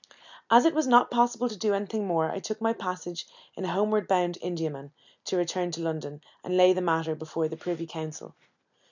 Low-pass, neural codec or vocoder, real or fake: 7.2 kHz; none; real